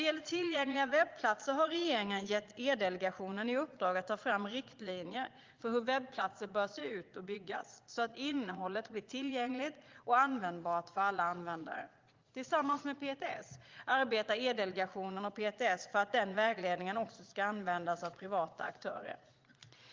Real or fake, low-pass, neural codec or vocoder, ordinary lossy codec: fake; 7.2 kHz; vocoder, 22.05 kHz, 80 mel bands, Vocos; Opus, 32 kbps